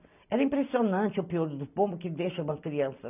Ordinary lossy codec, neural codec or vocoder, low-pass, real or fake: none; none; 3.6 kHz; real